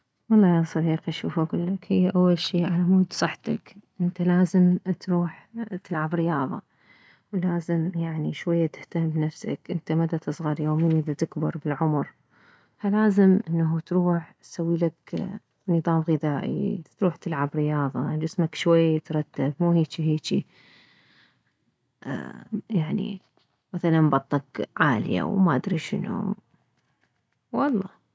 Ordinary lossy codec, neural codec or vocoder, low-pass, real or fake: none; none; none; real